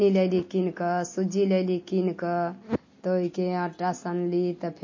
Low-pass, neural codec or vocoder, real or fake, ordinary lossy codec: 7.2 kHz; none; real; MP3, 32 kbps